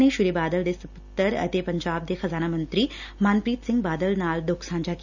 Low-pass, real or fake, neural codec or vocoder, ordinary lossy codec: 7.2 kHz; real; none; none